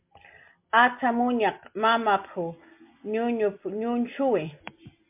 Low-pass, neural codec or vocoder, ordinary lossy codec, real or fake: 3.6 kHz; none; MP3, 32 kbps; real